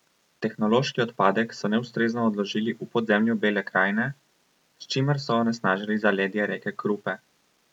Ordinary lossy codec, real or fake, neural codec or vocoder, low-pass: none; real; none; 19.8 kHz